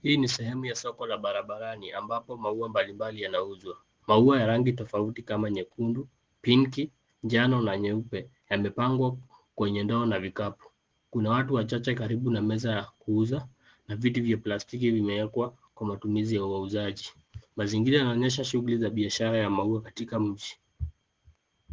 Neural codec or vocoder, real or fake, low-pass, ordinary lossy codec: none; real; 7.2 kHz; Opus, 16 kbps